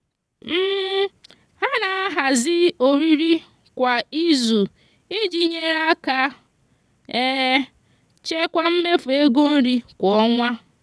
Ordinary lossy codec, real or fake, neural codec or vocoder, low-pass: none; fake; vocoder, 22.05 kHz, 80 mel bands, WaveNeXt; none